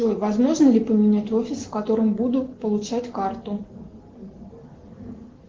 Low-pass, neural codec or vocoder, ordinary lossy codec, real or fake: 7.2 kHz; none; Opus, 16 kbps; real